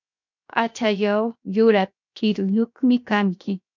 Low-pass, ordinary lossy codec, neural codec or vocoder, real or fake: 7.2 kHz; MP3, 64 kbps; codec, 16 kHz, 0.7 kbps, FocalCodec; fake